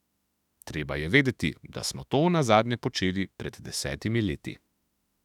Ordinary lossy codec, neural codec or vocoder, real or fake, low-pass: none; autoencoder, 48 kHz, 32 numbers a frame, DAC-VAE, trained on Japanese speech; fake; 19.8 kHz